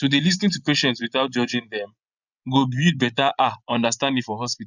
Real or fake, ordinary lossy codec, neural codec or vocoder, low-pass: fake; none; vocoder, 24 kHz, 100 mel bands, Vocos; 7.2 kHz